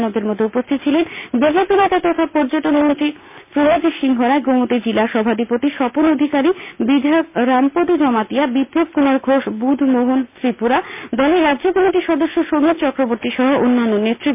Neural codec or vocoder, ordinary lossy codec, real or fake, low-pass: none; MP3, 32 kbps; real; 3.6 kHz